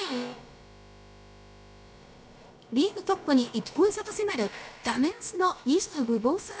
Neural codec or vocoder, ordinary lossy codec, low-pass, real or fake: codec, 16 kHz, about 1 kbps, DyCAST, with the encoder's durations; none; none; fake